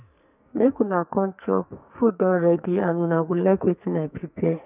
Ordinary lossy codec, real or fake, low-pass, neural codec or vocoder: none; fake; 3.6 kHz; codec, 44.1 kHz, 2.6 kbps, SNAC